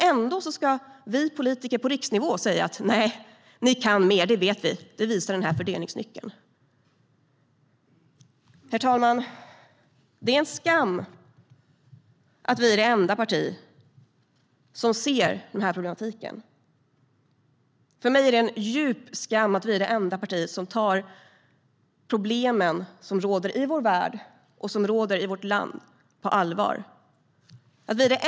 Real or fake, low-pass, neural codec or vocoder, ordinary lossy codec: real; none; none; none